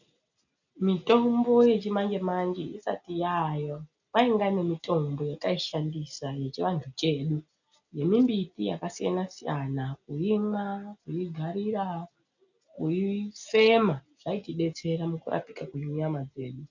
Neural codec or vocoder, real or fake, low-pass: none; real; 7.2 kHz